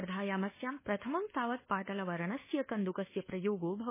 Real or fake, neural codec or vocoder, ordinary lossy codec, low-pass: fake; codec, 24 kHz, 1.2 kbps, DualCodec; MP3, 16 kbps; 3.6 kHz